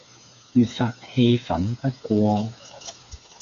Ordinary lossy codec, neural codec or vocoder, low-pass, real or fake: AAC, 64 kbps; codec, 16 kHz, 4 kbps, FreqCodec, smaller model; 7.2 kHz; fake